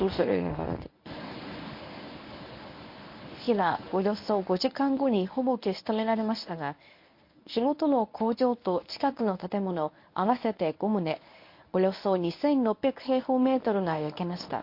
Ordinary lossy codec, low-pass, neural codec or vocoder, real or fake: MP3, 32 kbps; 5.4 kHz; codec, 24 kHz, 0.9 kbps, WavTokenizer, medium speech release version 1; fake